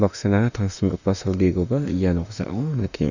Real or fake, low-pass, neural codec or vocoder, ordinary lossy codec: fake; 7.2 kHz; autoencoder, 48 kHz, 32 numbers a frame, DAC-VAE, trained on Japanese speech; none